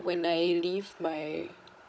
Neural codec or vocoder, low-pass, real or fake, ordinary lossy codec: codec, 16 kHz, 16 kbps, FunCodec, trained on Chinese and English, 50 frames a second; none; fake; none